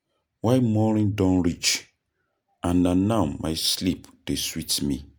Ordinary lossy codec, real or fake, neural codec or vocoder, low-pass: none; real; none; none